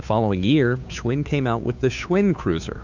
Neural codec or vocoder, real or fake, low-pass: codec, 16 kHz, 2 kbps, FunCodec, trained on Chinese and English, 25 frames a second; fake; 7.2 kHz